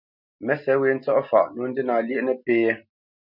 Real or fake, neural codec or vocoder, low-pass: real; none; 5.4 kHz